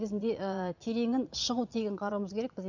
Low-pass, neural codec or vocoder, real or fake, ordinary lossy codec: 7.2 kHz; vocoder, 22.05 kHz, 80 mel bands, Vocos; fake; none